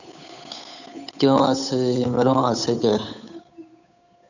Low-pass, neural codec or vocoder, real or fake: 7.2 kHz; codec, 16 kHz, 8 kbps, FunCodec, trained on Chinese and English, 25 frames a second; fake